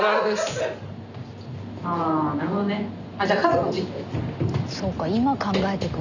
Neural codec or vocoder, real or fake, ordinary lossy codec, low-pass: none; real; none; 7.2 kHz